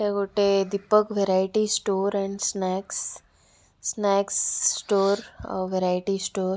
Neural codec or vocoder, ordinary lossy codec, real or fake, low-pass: none; none; real; none